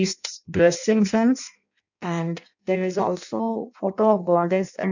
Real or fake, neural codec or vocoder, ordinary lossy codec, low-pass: fake; codec, 16 kHz in and 24 kHz out, 0.6 kbps, FireRedTTS-2 codec; none; 7.2 kHz